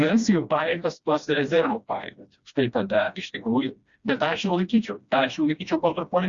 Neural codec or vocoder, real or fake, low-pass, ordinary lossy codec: codec, 16 kHz, 1 kbps, FreqCodec, smaller model; fake; 7.2 kHz; Opus, 64 kbps